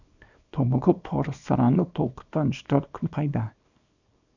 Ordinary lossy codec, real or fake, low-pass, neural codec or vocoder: none; fake; 7.2 kHz; codec, 24 kHz, 0.9 kbps, WavTokenizer, small release